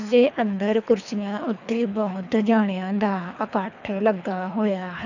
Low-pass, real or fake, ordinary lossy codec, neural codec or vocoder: 7.2 kHz; fake; none; codec, 24 kHz, 3 kbps, HILCodec